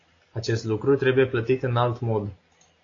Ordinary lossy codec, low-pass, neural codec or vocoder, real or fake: AAC, 32 kbps; 7.2 kHz; none; real